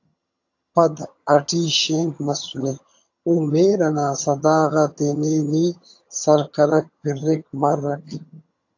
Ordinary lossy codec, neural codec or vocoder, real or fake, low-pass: AAC, 48 kbps; vocoder, 22.05 kHz, 80 mel bands, HiFi-GAN; fake; 7.2 kHz